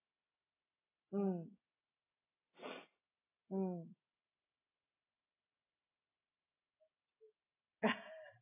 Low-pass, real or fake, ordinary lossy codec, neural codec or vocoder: 3.6 kHz; real; AAC, 16 kbps; none